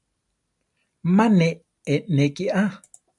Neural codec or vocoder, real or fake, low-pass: none; real; 10.8 kHz